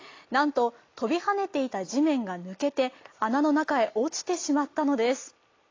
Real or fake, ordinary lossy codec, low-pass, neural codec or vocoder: real; AAC, 32 kbps; 7.2 kHz; none